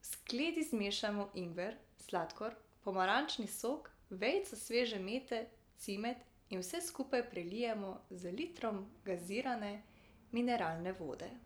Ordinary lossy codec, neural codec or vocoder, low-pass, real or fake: none; none; none; real